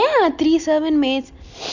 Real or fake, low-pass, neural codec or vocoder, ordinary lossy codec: real; 7.2 kHz; none; none